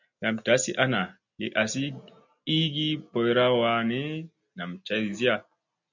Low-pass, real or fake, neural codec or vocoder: 7.2 kHz; real; none